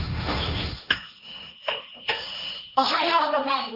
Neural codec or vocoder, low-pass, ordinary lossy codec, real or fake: codec, 16 kHz, 1.1 kbps, Voila-Tokenizer; 5.4 kHz; none; fake